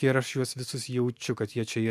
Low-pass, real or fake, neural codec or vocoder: 14.4 kHz; real; none